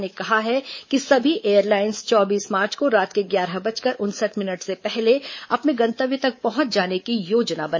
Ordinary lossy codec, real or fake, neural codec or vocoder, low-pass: MP3, 32 kbps; fake; codec, 24 kHz, 3.1 kbps, DualCodec; 7.2 kHz